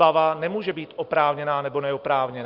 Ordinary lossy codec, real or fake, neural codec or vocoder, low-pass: Opus, 32 kbps; real; none; 5.4 kHz